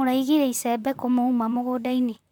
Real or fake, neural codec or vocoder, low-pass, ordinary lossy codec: fake; vocoder, 44.1 kHz, 128 mel bands, Pupu-Vocoder; 19.8 kHz; MP3, 96 kbps